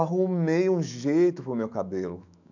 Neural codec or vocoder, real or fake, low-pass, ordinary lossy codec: autoencoder, 48 kHz, 128 numbers a frame, DAC-VAE, trained on Japanese speech; fake; 7.2 kHz; none